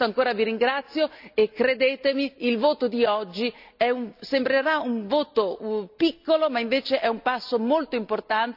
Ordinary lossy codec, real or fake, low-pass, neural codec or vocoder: none; real; 5.4 kHz; none